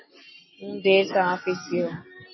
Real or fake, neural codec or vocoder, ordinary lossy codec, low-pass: real; none; MP3, 24 kbps; 7.2 kHz